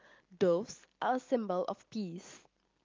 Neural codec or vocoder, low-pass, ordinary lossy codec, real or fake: none; 7.2 kHz; Opus, 24 kbps; real